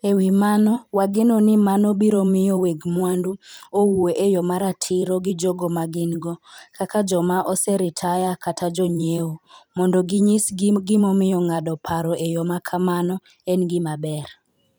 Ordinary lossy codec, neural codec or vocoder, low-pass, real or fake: none; vocoder, 44.1 kHz, 128 mel bands every 512 samples, BigVGAN v2; none; fake